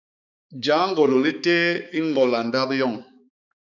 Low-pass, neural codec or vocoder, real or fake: 7.2 kHz; codec, 16 kHz, 4 kbps, X-Codec, HuBERT features, trained on balanced general audio; fake